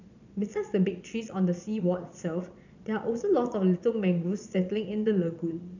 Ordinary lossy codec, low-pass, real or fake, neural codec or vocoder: none; 7.2 kHz; fake; vocoder, 22.05 kHz, 80 mel bands, Vocos